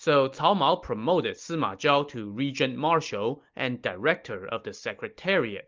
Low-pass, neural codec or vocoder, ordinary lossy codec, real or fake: 7.2 kHz; none; Opus, 24 kbps; real